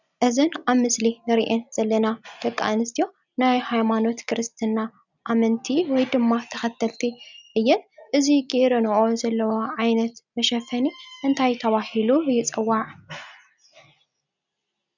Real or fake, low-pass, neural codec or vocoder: real; 7.2 kHz; none